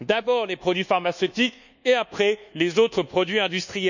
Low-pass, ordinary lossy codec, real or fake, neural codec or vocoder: 7.2 kHz; none; fake; codec, 24 kHz, 1.2 kbps, DualCodec